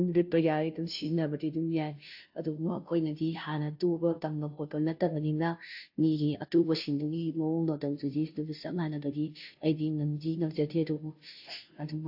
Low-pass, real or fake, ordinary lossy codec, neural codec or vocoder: 5.4 kHz; fake; AAC, 48 kbps; codec, 16 kHz, 0.5 kbps, FunCodec, trained on Chinese and English, 25 frames a second